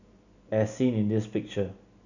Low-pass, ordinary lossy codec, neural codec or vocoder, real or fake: 7.2 kHz; none; none; real